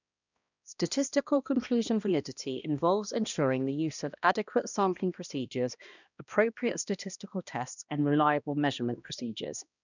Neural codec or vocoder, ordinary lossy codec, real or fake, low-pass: codec, 16 kHz, 1 kbps, X-Codec, HuBERT features, trained on balanced general audio; none; fake; 7.2 kHz